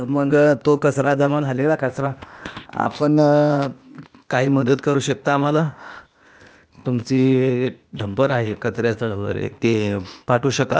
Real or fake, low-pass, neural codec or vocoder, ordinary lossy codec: fake; none; codec, 16 kHz, 0.8 kbps, ZipCodec; none